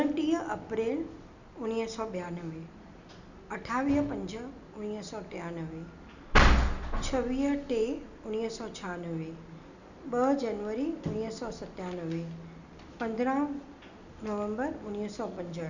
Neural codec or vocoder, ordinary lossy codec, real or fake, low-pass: none; none; real; 7.2 kHz